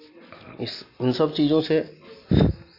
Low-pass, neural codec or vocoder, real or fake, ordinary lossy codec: 5.4 kHz; none; real; AAC, 32 kbps